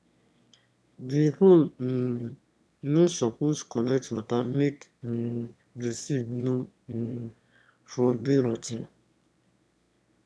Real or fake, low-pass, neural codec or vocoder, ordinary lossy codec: fake; none; autoencoder, 22.05 kHz, a latent of 192 numbers a frame, VITS, trained on one speaker; none